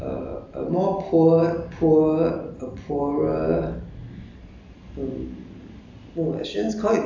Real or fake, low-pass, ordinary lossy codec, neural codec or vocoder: real; 7.2 kHz; AAC, 48 kbps; none